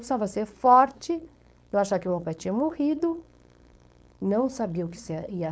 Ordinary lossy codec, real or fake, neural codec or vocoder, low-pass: none; fake; codec, 16 kHz, 4.8 kbps, FACodec; none